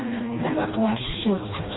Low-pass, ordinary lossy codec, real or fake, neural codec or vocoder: 7.2 kHz; AAC, 16 kbps; fake; codec, 16 kHz, 1 kbps, FreqCodec, smaller model